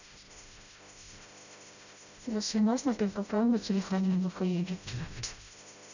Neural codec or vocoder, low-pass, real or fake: codec, 16 kHz, 0.5 kbps, FreqCodec, smaller model; 7.2 kHz; fake